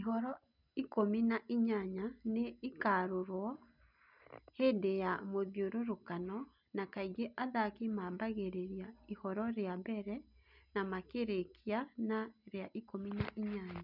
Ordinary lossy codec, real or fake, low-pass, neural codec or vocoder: none; real; 5.4 kHz; none